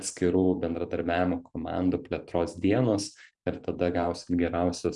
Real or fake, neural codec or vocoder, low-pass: fake; vocoder, 44.1 kHz, 128 mel bands every 512 samples, BigVGAN v2; 10.8 kHz